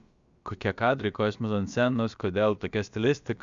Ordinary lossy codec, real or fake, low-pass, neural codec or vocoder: Opus, 64 kbps; fake; 7.2 kHz; codec, 16 kHz, about 1 kbps, DyCAST, with the encoder's durations